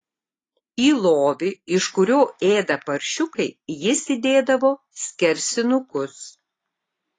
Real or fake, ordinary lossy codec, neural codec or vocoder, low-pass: real; AAC, 32 kbps; none; 10.8 kHz